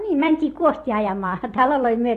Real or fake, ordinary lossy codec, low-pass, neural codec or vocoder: fake; AAC, 48 kbps; 14.4 kHz; vocoder, 44.1 kHz, 128 mel bands every 256 samples, BigVGAN v2